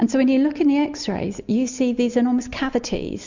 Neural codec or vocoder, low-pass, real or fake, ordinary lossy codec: none; 7.2 kHz; real; MP3, 64 kbps